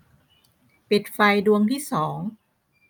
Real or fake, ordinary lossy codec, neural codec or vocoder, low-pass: real; none; none; none